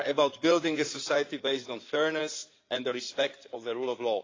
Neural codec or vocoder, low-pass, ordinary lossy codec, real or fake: codec, 16 kHz in and 24 kHz out, 2.2 kbps, FireRedTTS-2 codec; 7.2 kHz; AAC, 32 kbps; fake